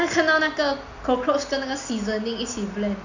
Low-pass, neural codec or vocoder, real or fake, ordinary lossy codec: 7.2 kHz; none; real; none